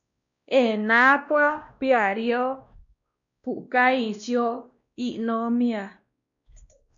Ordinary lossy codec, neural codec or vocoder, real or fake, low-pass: MP3, 64 kbps; codec, 16 kHz, 1 kbps, X-Codec, WavLM features, trained on Multilingual LibriSpeech; fake; 7.2 kHz